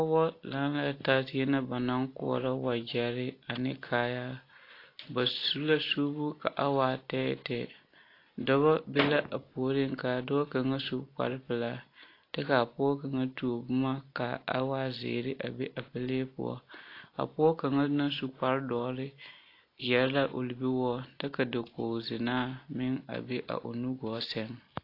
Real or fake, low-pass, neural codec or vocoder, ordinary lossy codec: real; 5.4 kHz; none; AAC, 32 kbps